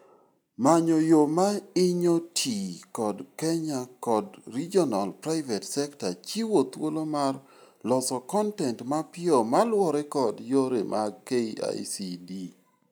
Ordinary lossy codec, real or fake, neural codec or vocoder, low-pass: none; real; none; none